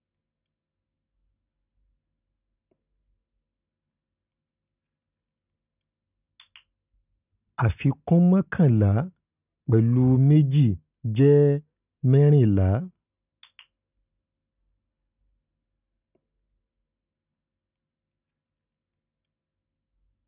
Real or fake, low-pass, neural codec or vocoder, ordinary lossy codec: real; 3.6 kHz; none; none